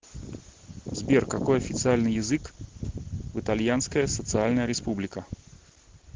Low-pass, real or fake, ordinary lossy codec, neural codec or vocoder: 7.2 kHz; real; Opus, 16 kbps; none